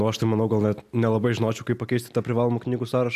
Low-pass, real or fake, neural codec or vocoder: 14.4 kHz; real; none